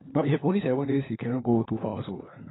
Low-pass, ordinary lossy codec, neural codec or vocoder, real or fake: 7.2 kHz; AAC, 16 kbps; codec, 16 kHz, 4 kbps, FunCodec, trained on LibriTTS, 50 frames a second; fake